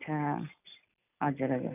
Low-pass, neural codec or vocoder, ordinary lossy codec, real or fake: 3.6 kHz; none; none; real